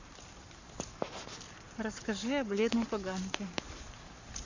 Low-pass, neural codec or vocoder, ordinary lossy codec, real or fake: 7.2 kHz; codec, 44.1 kHz, 7.8 kbps, Pupu-Codec; Opus, 64 kbps; fake